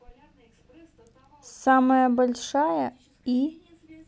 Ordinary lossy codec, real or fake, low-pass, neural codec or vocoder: none; real; none; none